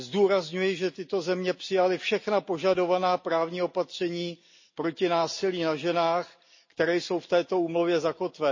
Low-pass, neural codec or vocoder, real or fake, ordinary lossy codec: 7.2 kHz; none; real; MP3, 32 kbps